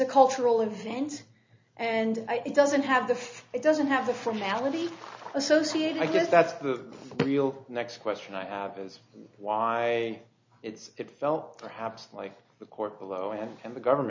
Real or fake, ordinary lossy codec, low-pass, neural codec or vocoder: real; MP3, 64 kbps; 7.2 kHz; none